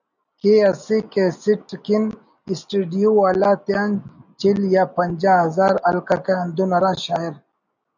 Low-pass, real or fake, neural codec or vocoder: 7.2 kHz; real; none